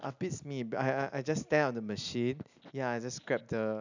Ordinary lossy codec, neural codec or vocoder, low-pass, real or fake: none; none; 7.2 kHz; real